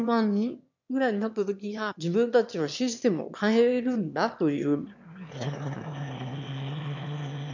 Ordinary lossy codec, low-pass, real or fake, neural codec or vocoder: none; 7.2 kHz; fake; autoencoder, 22.05 kHz, a latent of 192 numbers a frame, VITS, trained on one speaker